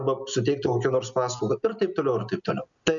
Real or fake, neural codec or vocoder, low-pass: real; none; 7.2 kHz